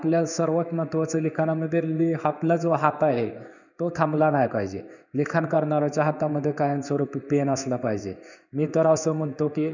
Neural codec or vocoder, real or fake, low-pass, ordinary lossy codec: codec, 16 kHz in and 24 kHz out, 1 kbps, XY-Tokenizer; fake; 7.2 kHz; none